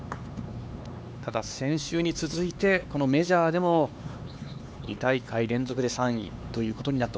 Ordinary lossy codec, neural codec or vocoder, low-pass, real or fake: none; codec, 16 kHz, 4 kbps, X-Codec, HuBERT features, trained on LibriSpeech; none; fake